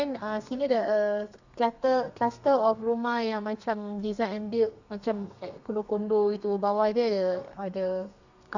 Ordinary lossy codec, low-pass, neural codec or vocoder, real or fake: none; 7.2 kHz; codec, 32 kHz, 1.9 kbps, SNAC; fake